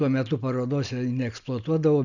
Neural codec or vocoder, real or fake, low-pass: none; real; 7.2 kHz